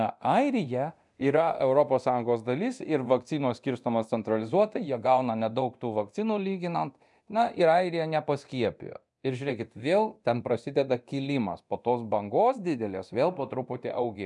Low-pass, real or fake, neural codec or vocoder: 10.8 kHz; fake; codec, 24 kHz, 0.9 kbps, DualCodec